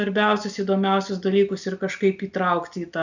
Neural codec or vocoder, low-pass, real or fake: none; 7.2 kHz; real